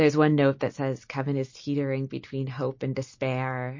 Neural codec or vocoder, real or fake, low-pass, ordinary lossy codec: none; real; 7.2 kHz; MP3, 32 kbps